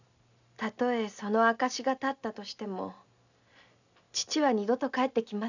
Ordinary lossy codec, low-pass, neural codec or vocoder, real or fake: none; 7.2 kHz; none; real